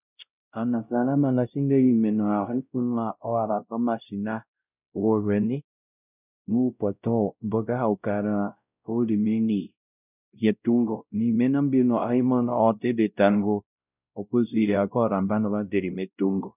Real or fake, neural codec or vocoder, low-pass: fake; codec, 16 kHz, 0.5 kbps, X-Codec, WavLM features, trained on Multilingual LibriSpeech; 3.6 kHz